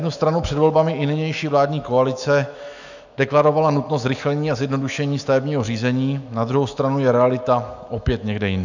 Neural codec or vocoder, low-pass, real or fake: autoencoder, 48 kHz, 128 numbers a frame, DAC-VAE, trained on Japanese speech; 7.2 kHz; fake